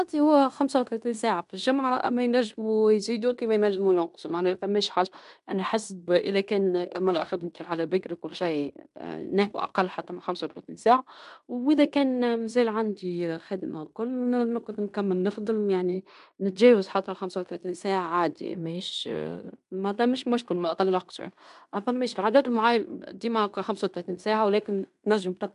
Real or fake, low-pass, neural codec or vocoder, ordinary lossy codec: fake; 10.8 kHz; codec, 16 kHz in and 24 kHz out, 0.9 kbps, LongCat-Audio-Codec, fine tuned four codebook decoder; MP3, 96 kbps